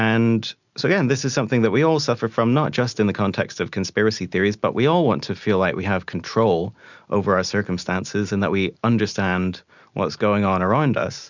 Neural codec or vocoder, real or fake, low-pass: none; real; 7.2 kHz